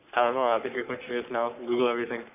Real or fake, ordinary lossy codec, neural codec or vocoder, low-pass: fake; none; codec, 44.1 kHz, 3.4 kbps, Pupu-Codec; 3.6 kHz